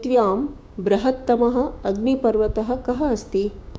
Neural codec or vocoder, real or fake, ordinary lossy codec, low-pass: codec, 16 kHz, 6 kbps, DAC; fake; none; none